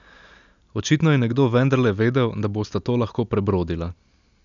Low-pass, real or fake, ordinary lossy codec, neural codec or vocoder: 7.2 kHz; real; none; none